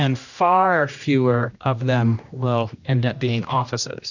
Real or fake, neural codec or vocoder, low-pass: fake; codec, 16 kHz, 1 kbps, X-Codec, HuBERT features, trained on general audio; 7.2 kHz